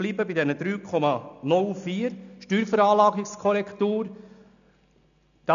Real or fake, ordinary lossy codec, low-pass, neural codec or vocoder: real; none; 7.2 kHz; none